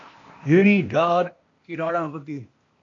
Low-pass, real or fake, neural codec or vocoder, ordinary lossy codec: 7.2 kHz; fake; codec, 16 kHz, 0.8 kbps, ZipCodec; MP3, 48 kbps